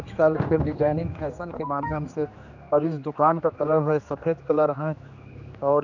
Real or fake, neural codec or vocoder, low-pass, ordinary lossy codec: fake; codec, 16 kHz, 2 kbps, X-Codec, HuBERT features, trained on general audio; 7.2 kHz; none